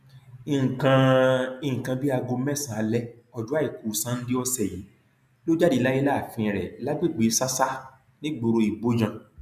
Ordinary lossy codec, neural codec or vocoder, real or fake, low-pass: none; none; real; 14.4 kHz